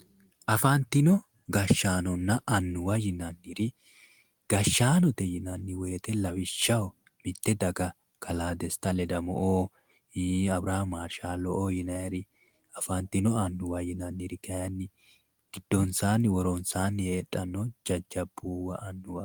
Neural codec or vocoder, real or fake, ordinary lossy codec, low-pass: none; real; Opus, 24 kbps; 19.8 kHz